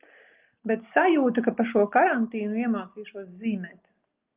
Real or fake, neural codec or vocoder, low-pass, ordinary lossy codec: real; none; 3.6 kHz; Opus, 32 kbps